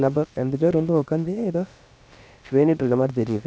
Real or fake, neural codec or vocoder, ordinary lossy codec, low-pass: fake; codec, 16 kHz, about 1 kbps, DyCAST, with the encoder's durations; none; none